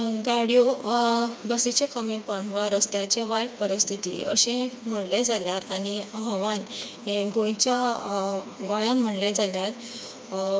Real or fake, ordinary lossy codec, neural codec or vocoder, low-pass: fake; none; codec, 16 kHz, 2 kbps, FreqCodec, smaller model; none